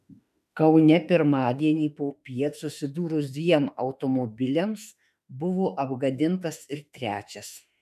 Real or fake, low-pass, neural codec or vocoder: fake; 14.4 kHz; autoencoder, 48 kHz, 32 numbers a frame, DAC-VAE, trained on Japanese speech